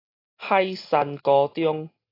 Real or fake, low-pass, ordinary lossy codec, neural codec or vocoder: real; 5.4 kHz; AAC, 24 kbps; none